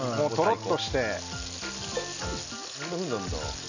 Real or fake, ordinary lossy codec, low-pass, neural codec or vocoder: real; none; 7.2 kHz; none